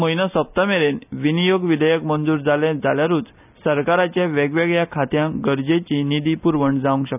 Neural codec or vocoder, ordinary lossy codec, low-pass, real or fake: none; none; 3.6 kHz; real